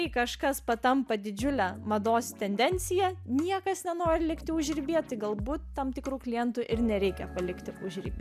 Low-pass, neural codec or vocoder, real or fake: 14.4 kHz; none; real